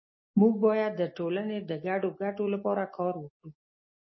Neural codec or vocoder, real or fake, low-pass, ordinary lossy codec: none; real; 7.2 kHz; MP3, 24 kbps